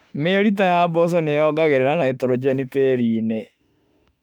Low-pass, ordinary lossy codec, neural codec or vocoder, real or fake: 19.8 kHz; none; autoencoder, 48 kHz, 32 numbers a frame, DAC-VAE, trained on Japanese speech; fake